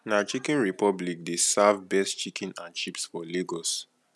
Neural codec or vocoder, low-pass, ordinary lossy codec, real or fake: none; none; none; real